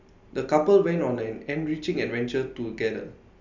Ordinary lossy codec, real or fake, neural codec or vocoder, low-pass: none; real; none; 7.2 kHz